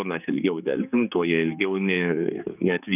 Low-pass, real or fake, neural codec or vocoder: 3.6 kHz; fake; codec, 16 kHz, 4 kbps, X-Codec, HuBERT features, trained on general audio